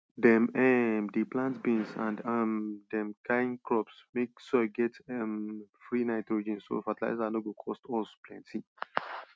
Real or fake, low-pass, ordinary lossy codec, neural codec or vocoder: real; none; none; none